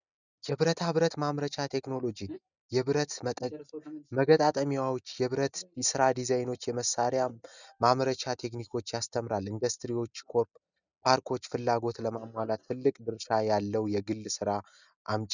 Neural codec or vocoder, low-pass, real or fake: none; 7.2 kHz; real